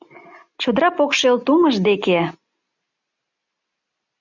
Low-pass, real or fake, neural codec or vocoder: 7.2 kHz; real; none